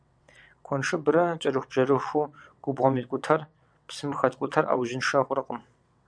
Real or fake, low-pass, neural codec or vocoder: fake; 9.9 kHz; vocoder, 22.05 kHz, 80 mel bands, WaveNeXt